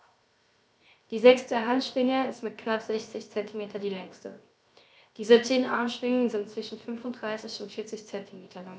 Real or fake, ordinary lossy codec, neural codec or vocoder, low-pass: fake; none; codec, 16 kHz, 0.7 kbps, FocalCodec; none